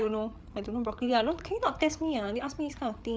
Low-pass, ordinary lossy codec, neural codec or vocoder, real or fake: none; none; codec, 16 kHz, 8 kbps, FreqCodec, larger model; fake